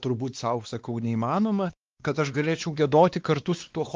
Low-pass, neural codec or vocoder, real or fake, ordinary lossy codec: 7.2 kHz; codec, 16 kHz, 2 kbps, X-Codec, WavLM features, trained on Multilingual LibriSpeech; fake; Opus, 32 kbps